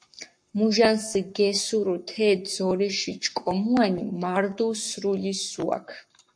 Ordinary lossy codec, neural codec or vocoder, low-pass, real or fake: MP3, 48 kbps; codec, 44.1 kHz, 7.8 kbps, Pupu-Codec; 9.9 kHz; fake